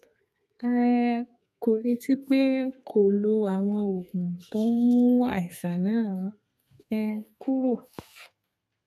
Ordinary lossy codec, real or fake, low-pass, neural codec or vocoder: none; fake; 14.4 kHz; codec, 32 kHz, 1.9 kbps, SNAC